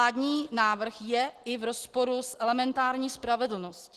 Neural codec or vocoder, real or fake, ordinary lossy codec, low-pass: none; real; Opus, 16 kbps; 14.4 kHz